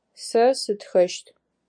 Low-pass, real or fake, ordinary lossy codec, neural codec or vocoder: 9.9 kHz; real; MP3, 64 kbps; none